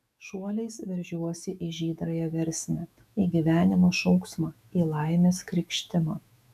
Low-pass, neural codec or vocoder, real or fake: 14.4 kHz; autoencoder, 48 kHz, 128 numbers a frame, DAC-VAE, trained on Japanese speech; fake